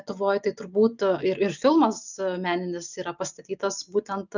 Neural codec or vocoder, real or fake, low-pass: none; real; 7.2 kHz